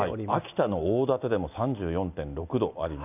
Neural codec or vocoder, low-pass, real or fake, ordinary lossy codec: none; 3.6 kHz; real; none